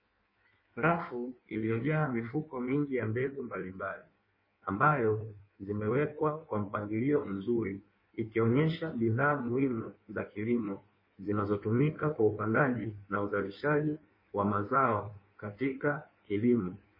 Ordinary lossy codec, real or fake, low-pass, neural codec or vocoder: MP3, 24 kbps; fake; 5.4 kHz; codec, 16 kHz in and 24 kHz out, 1.1 kbps, FireRedTTS-2 codec